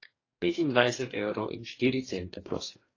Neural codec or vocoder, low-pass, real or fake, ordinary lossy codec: codec, 44.1 kHz, 2.6 kbps, DAC; 7.2 kHz; fake; AAC, 32 kbps